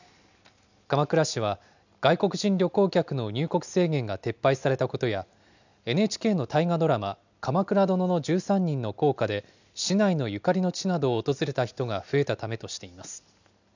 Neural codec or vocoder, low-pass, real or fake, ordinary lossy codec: none; 7.2 kHz; real; none